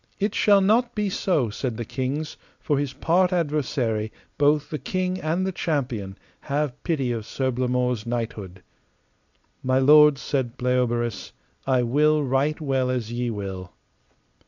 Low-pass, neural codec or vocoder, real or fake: 7.2 kHz; none; real